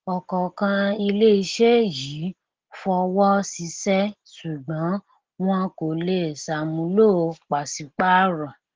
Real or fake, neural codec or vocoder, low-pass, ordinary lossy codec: real; none; 7.2 kHz; Opus, 16 kbps